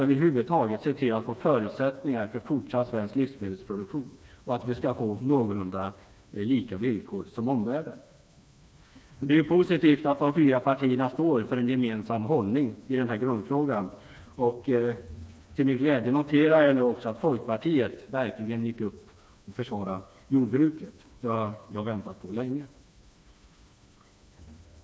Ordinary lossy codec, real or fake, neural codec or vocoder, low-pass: none; fake; codec, 16 kHz, 2 kbps, FreqCodec, smaller model; none